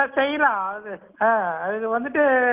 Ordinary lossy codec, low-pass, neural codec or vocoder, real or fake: Opus, 32 kbps; 3.6 kHz; none; real